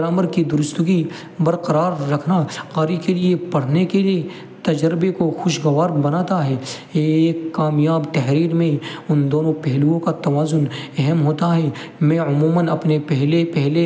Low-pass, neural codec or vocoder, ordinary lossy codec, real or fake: none; none; none; real